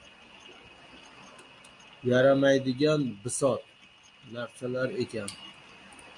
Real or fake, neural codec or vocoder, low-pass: real; none; 10.8 kHz